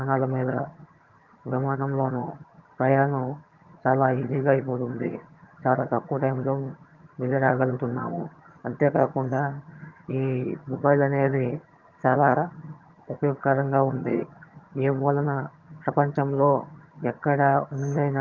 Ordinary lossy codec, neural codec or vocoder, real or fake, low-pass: Opus, 32 kbps; vocoder, 22.05 kHz, 80 mel bands, HiFi-GAN; fake; 7.2 kHz